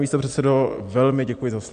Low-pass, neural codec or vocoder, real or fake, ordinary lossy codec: 9.9 kHz; none; real; MP3, 64 kbps